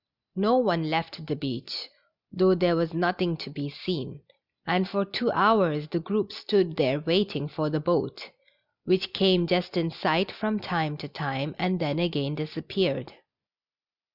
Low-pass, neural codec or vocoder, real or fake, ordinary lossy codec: 5.4 kHz; none; real; Opus, 64 kbps